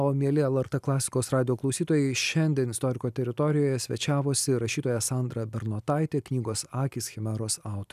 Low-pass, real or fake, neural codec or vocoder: 14.4 kHz; real; none